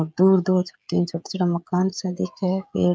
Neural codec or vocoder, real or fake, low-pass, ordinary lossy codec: codec, 16 kHz, 16 kbps, FreqCodec, smaller model; fake; none; none